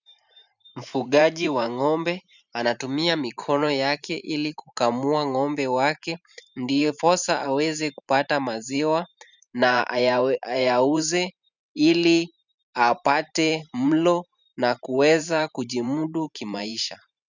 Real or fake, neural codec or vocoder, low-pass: fake; vocoder, 44.1 kHz, 128 mel bands every 512 samples, BigVGAN v2; 7.2 kHz